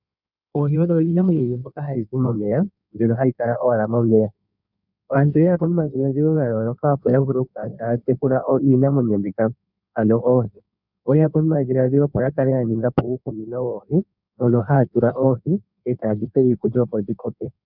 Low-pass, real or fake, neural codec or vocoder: 5.4 kHz; fake; codec, 16 kHz in and 24 kHz out, 1.1 kbps, FireRedTTS-2 codec